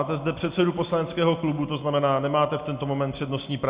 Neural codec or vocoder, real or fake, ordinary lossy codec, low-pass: none; real; Opus, 64 kbps; 3.6 kHz